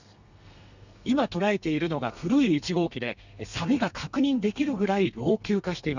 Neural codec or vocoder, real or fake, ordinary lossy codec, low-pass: codec, 32 kHz, 1.9 kbps, SNAC; fake; none; 7.2 kHz